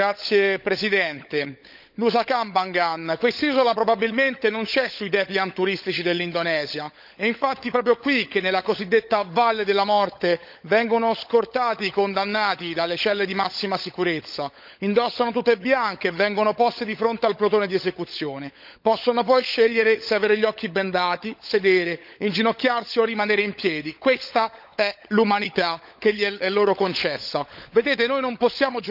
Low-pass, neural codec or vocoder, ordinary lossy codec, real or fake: 5.4 kHz; codec, 16 kHz, 16 kbps, FunCodec, trained on LibriTTS, 50 frames a second; none; fake